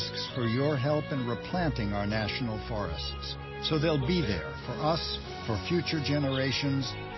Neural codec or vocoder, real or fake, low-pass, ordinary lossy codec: none; real; 7.2 kHz; MP3, 24 kbps